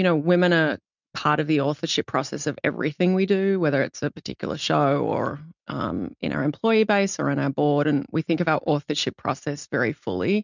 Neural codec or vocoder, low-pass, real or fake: none; 7.2 kHz; real